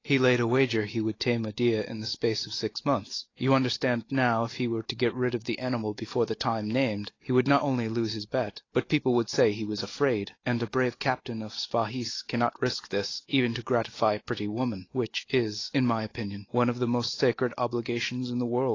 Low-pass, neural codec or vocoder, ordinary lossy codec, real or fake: 7.2 kHz; none; AAC, 32 kbps; real